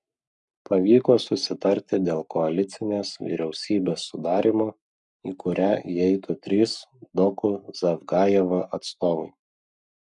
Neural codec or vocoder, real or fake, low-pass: codec, 44.1 kHz, 7.8 kbps, Pupu-Codec; fake; 10.8 kHz